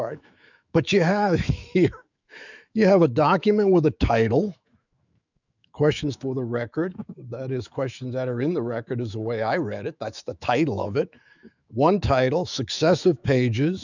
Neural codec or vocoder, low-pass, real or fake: autoencoder, 48 kHz, 128 numbers a frame, DAC-VAE, trained on Japanese speech; 7.2 kHz; fake